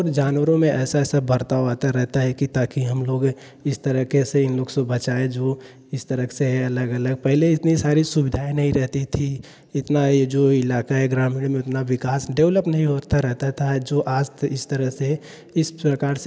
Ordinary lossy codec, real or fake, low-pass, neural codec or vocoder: none; real; none; none